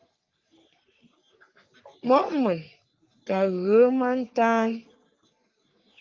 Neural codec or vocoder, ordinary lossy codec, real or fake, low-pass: codec, 44.1 kHz, 3.4 kbps, Pupu-Codec; Opus, 24 kbps; fake; 7.2 kHz